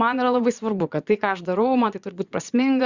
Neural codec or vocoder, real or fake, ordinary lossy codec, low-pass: vocoder, 22.05 kHz, 80 mel bands, Vocos; fake; Opus, 64 kbps; 7.2 kHz